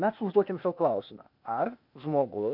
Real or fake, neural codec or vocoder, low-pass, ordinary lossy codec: fake; codec, 16 kHz, 0.8 kbps, ZipCodec; 5.4 kHz; MP3, 48 kbps